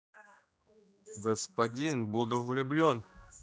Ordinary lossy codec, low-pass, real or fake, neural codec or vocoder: none; none; fake; codec, 16 kHz, 1 kbps, X-Codec, HuBERT features, trained on general audio